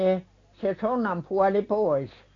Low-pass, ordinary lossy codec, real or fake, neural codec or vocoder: 7.2 kHz; AAC, 32 kbps; real; none